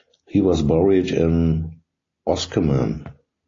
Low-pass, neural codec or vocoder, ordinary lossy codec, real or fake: 7.2 kHz; none; AAC, 32 kbps; real